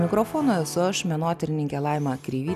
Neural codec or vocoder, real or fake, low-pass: none; real; 14.4 kHz